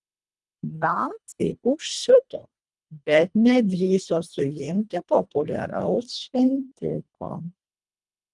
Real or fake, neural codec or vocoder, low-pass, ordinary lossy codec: fake; codec, 24 kHz, 1.5 kbps, HILCodec; 10.8 kHz; Opus, 32 kbps